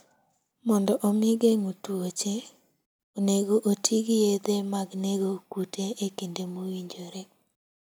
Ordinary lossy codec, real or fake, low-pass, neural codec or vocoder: none; real; none; none